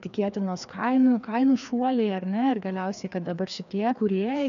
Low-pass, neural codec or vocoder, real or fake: 7.2 kHz; codec, 16 kHz, 2 kbps, FreqCodec, larger model; fake